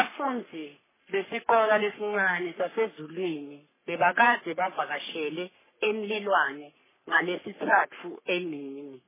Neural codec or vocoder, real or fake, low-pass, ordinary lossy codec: codec, 44.1 kHz, 3.4 kbps, Pupu-Codec; fake; 3.6 kHz; MP3, 16 kbps